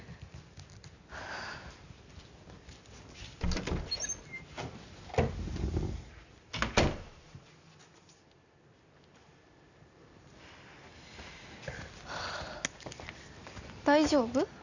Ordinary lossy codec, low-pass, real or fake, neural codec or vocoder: none; 7.2 kHz; real; none